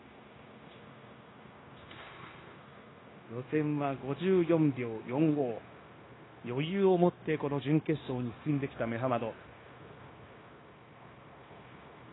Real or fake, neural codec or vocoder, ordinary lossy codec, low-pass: fake; codec, 16 kHz, 0.9 kbps, LongCat-Audio-Codec; AAC, 16 kbps; 7.2 kHz